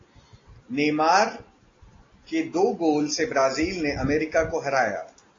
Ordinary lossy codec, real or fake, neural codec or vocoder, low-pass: AAC, 32 kbps; real; none; 7.2 kHz